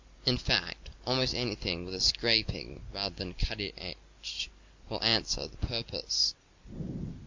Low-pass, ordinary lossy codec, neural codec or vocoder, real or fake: 7.2 kHz; MP3, 48 kbps; none; real